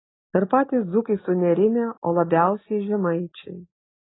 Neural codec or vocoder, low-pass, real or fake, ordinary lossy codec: none; 7.2 kHz; real; AAC, 16 kbps